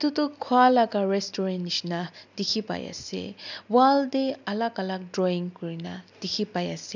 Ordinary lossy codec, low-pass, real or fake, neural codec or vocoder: none; 7.2 kHz; real; none